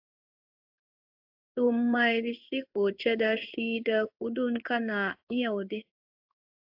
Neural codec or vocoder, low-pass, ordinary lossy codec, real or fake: codec, 16 kHz in and 24 kHz out, 1 kbps, XY-Tokenizer; 5.4 kHz; AAC, 48 kbps; fake